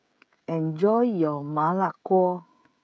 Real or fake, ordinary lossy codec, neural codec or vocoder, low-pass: fake; none; codec, 16 kHz, 16 kbps, FreqCodec, smaller model; none